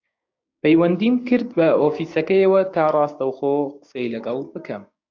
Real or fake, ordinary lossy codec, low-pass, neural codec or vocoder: fake; AAC, 48 kbps; 7.2 kHz; codec, 16 kHz, 6 kbps, DAC